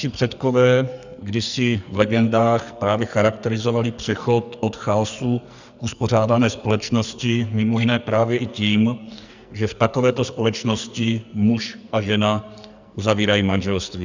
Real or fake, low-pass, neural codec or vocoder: fake; 7.2 kHz; codec, 44.1 kHz, 2.6 kbps, SNAC